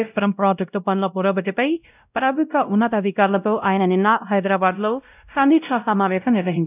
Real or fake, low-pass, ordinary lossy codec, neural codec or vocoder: fake; 3.6 kHz; none; codec, 16 kHz, 0.5 kbps, X-Codec, WavLM features, trained on Multilingual LibriSpeech